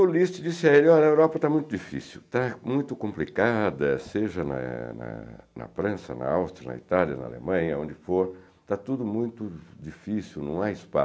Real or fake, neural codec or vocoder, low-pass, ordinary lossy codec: real; none; none; none